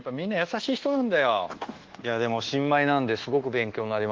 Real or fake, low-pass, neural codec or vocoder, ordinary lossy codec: fake; 7.2 kHz; codec, 24 kHz, 1.2 kbps, DualCodec; Opus, 16 kbps